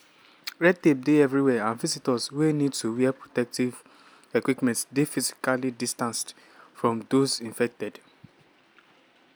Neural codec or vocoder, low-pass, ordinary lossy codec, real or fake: none; none; none; real